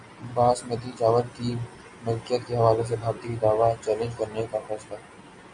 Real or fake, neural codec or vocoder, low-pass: real; none; 9.9 kHz